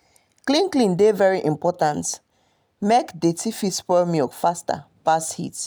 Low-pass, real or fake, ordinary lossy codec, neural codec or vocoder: none; real; none; none